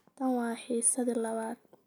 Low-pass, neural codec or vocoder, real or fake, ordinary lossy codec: none; none; real; none